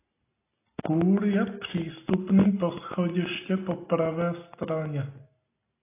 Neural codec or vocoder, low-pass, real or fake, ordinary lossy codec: none; 3.6 kHz; real; AAC, 24 kbps